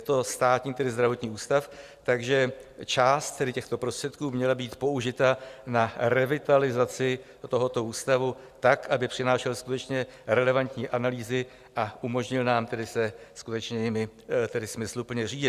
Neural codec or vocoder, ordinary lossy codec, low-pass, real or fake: none; Opus, 64 kbps; 14.4 kHz; real